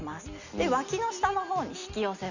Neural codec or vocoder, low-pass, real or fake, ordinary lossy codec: none; 7.2 kHz; real; none